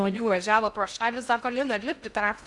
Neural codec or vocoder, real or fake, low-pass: codec, 16 kHz in and 24 kHz out, 0.6 kbps, FocalCodec, streaming, 2048 codes; fake; 10.8 kHz